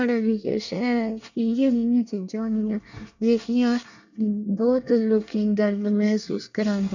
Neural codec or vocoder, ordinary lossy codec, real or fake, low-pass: codec, 24 kHz, 1 kbps, SNAC; none; fake; 7.2 kHz